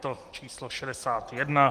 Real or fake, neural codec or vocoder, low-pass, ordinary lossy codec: real; none; 14.4 kHz; Opus, 24 kbps